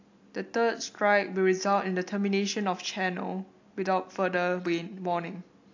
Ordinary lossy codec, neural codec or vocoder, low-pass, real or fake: AAC, 48 kbps; none; 7.2 kHz; real